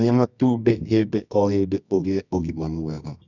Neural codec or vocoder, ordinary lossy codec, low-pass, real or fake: codec, 24 kHz, 0.9 kbps, WavTokenizer, medium music audio release; none; 7.2 kHz; fake